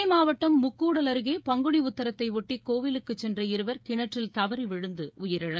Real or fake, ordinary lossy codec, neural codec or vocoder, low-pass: fake; none; codec, 16 kHz, 16 kbps, FreqCodec, smaller model; none